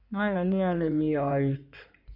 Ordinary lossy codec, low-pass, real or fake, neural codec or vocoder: none; 5.4 kHz; fake; codec, 16 kHz in and 24 kHz out, 2.2 kbps, FireRedTTS-2 codec